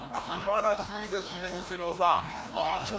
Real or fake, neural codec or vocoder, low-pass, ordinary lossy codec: fake; codec, 16 kHz, 1 kbps, FreqCodec, larger model; none; none